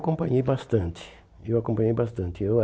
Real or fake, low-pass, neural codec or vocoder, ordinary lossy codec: real; none; none; none